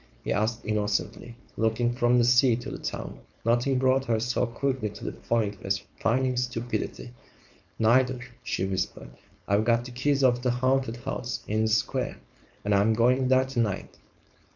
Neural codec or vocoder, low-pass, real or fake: codec, 16 kHz, 4.8 kbps, FACodec; 7.2 kHz; fake